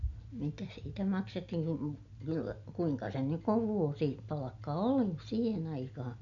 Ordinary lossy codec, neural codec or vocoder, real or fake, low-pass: none; none; real; 7.2 kHz